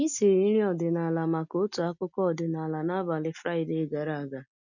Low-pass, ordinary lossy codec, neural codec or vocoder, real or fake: 7.2 kHz; none; none; real